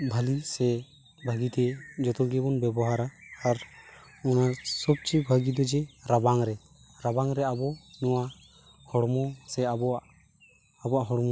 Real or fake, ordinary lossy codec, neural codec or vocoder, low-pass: real; none; none; none